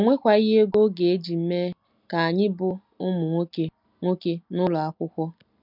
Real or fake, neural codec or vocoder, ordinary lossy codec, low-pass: real; none; none; 5.4 kHz